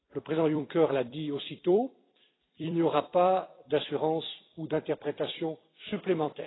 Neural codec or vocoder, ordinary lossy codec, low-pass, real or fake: vocoder, 44.1 kHz, 128 mel bands every 256 samples, BigVGAN v2; AAC, 16 kbps; 7.2 kHz; fake